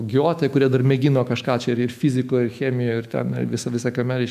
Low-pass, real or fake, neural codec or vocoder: 14.4 kHz; fake; autoencoder, 48 kHz, 128 numbers a frame, DAC-VAE, trained on Japanese speech